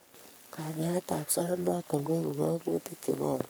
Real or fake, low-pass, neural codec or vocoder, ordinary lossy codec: fake; none; codec, 44.1 kHz, 3.4 kbps, Pupu-Codec; none